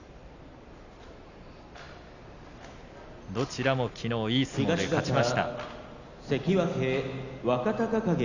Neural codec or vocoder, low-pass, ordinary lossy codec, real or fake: none; 7.2 kHz; none; real